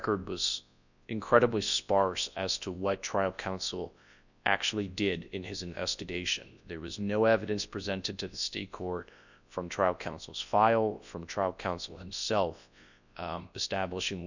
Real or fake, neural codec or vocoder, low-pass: fake; codec, 24 kHz, 0.9 kbps, WavTokenizer, large speech release; 7.2 kHz